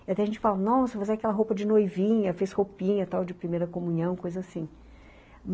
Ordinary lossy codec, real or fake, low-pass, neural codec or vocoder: none; real; none; none